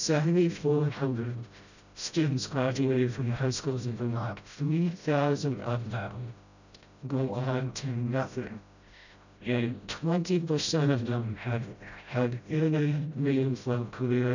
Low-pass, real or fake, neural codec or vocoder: 7.2 kHz; fake; codec, 16 kHz, 0.5 kbps, FreqCodec, smaller model